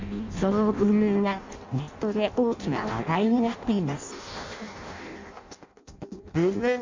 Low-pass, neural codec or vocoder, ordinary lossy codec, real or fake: 7.2 kHz; codec, 16 kHz in and 24 kHz out, 0.6 kbps, FireRedTTS-2 codec; MP3, 64 kbps; fake